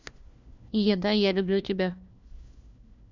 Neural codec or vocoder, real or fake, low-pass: codec, 16 kHz, 1 kbps, FunCodec, trained on LibriTTS, 50 frames a second; fake; 7.2 kHz